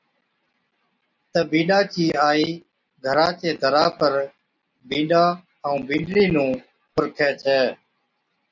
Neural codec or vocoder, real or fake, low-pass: none; real; 7.2 kHz